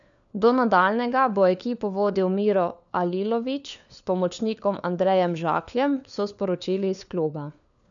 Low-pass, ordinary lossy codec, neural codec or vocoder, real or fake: 7.2 kHz; none; codec, 16 kHz, 4 kbps, FunCodec, trained on LibriTTS, 50 frames a second; fake